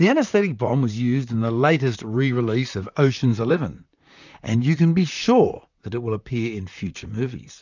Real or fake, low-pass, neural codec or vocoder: fake; 7.2 kHz; vocoder, 44.1 kHz, 128 mel bands, Pupu-Vocoder